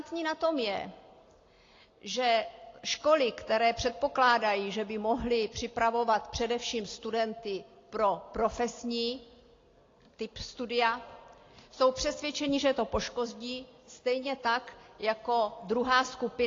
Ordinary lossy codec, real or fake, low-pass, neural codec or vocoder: AAC, 32 kbps; real; 7.2 kHz; none